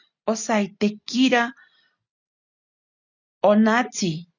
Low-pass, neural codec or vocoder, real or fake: 7.2 kHz; none; real